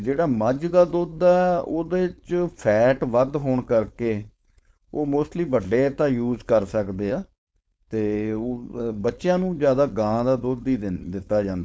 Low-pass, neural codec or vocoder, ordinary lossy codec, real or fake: none; codec, 16 kHz, 4.8 kbps, FACodec; none; fake